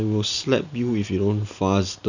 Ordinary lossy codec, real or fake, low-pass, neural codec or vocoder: none; real; 7.2 kHz; none